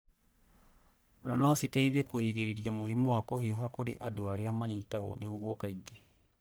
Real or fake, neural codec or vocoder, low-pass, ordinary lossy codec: fake; codec, 44.1 kHz, 1.7 kbps, Pupu-Codec; none; none